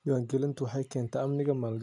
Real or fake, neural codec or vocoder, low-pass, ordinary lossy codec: real; none; 10.8 kHz; none